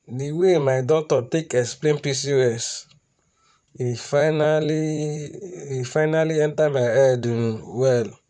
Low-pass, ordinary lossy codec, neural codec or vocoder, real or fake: 10.8 kHz; none; vocoder, 44.1 kHz, 128 mel bands, Pupu-Vocoder; fake